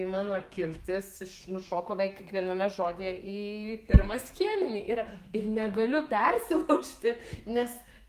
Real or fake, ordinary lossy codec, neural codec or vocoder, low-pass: fake; Opus, 32 kbps; codec, 32 kHz, 1.9 kbps, SNAC; 14.4 kHz